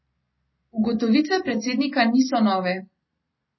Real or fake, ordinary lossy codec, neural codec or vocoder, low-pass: real; MP3, 24 kbps; none; 7.2 kHz